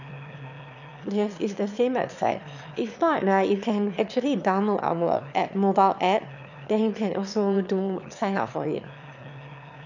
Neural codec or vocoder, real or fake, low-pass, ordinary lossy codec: autoencoder, 22.05 kHz, a latent of 192 numbers a frame, VITS, trained on one speaker; fake; 7.2 kHz; none